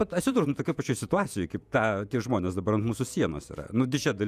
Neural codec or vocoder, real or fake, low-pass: vocoder, 48 kHz, 128 mel bands, Vocos; fake; 14.4 kHz